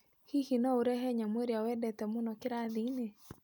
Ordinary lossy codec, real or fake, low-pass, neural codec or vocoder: none; real; none; none